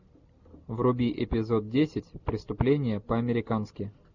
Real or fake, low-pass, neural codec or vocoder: real; 7.2 kHz; none